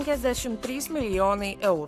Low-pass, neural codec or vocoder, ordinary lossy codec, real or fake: 14.4 kHz; codec, 44.1 kHz, 7.8 kbps, Pupu-Codec; AAC, 96 kbps; fake